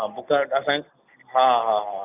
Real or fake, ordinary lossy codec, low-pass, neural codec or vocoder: real; none; 3.6 kHz; none